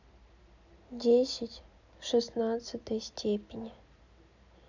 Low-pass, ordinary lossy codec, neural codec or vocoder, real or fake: 7.2 kHz; none; none; real